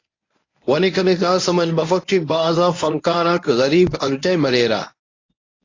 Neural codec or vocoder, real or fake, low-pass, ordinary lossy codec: codec, 24 kHz, 0.9 kbps, WavTokenizer, medium speech release version 1; fake; 7.2 kHz; AAC, 32 kbps